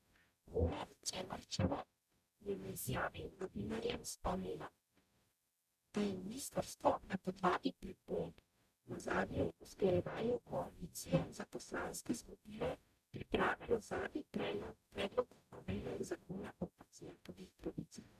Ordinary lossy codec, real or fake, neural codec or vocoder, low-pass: none; fake; codec, 44.1 kHz, 0.9 kbps, DAC; 14.4 kHz